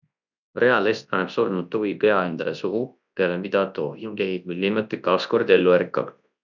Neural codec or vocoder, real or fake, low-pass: codec, 24 kHz, 0.9 kbps, WavTokenizer, large speech release; fake; 7.2 kHz